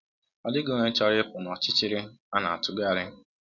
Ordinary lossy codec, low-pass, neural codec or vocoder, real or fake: none; 7.2 kHz; none; real